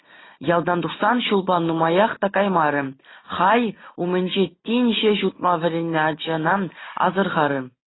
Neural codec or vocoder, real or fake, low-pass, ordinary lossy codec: none; real; 7.2 kHz; AAC, 16 kbps